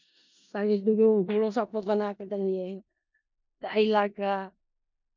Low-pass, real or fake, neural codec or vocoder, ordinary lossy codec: 7.2 kHz; fake; codec, 16 kHz in and 24 kHz out, 0.4 kbps, LongCat-Audio-Codec, four codebook decoder; MP3, 64 kbps